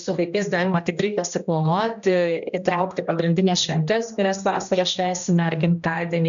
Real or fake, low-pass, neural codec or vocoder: fake; 7.2 kHz; codec, 16 kHz, 1 kbps, X-Codec, HuBERT features, trained on general audio